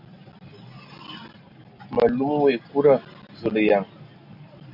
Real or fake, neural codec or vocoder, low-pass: real; none; 5.4 kHz